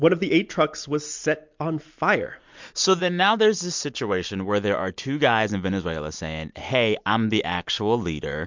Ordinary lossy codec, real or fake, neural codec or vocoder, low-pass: MP3, 64 kbps; real; none; 7.2 kHz